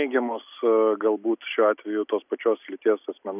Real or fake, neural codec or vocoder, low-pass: real; none; 3.6 kHz